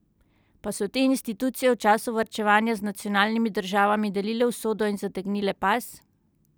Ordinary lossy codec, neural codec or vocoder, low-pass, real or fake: none; none; none; real